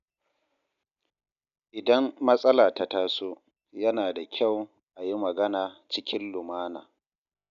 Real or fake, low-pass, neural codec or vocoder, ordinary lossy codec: real; 7.2 kHz; none; none